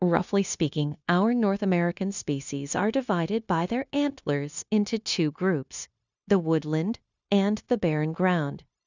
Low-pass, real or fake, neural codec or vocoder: 7.2 kHz; fake; codec, 16 kHz, 0.9 kbps, LongCat-Audio-Codec